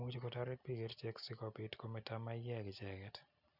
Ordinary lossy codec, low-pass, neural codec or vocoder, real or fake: none; 5.4 kHz; none; real